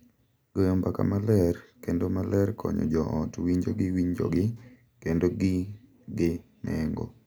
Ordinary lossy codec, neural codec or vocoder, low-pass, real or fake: none; none; none; real